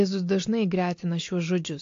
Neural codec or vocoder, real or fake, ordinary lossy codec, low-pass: none; real; AAC, 48 kbps; 7.2 kHz